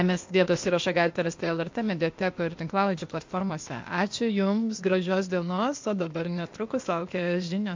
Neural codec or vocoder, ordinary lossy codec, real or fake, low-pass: codec, 16 kHz, 0.8 kbps, ZipCodec; MP3, 48 kbps; fake; 7.2 kHz